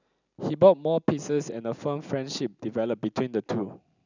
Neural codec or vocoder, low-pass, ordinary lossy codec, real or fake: none; 7.2 kHz; none; real